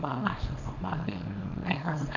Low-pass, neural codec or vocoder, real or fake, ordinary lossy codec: 7.2 kHz; codec, 24 kHz, 0.9 kbps, WavTokenizer, small release; fake; none